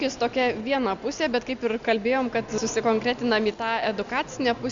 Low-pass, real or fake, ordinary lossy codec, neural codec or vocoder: 7.2 kHz; real; Opus, 64 kbps; none